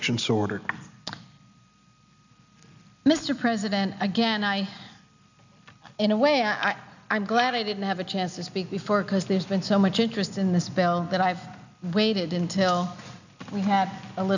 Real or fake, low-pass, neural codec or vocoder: real; 7.2 kHz; none